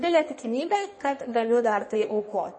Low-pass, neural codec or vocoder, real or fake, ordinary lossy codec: 9.9 kHz; codec, 16 kHz in and 24 kHz out, 1.1 kbps, FireRedTTS-2 codec; fake; MP3, 32 kbps